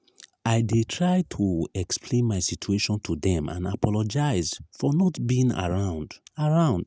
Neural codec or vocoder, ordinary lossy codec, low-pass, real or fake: none; none; none; real